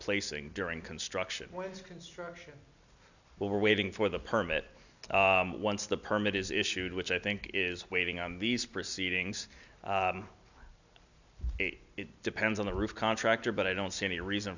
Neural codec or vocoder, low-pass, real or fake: none; 7.2 kHz; real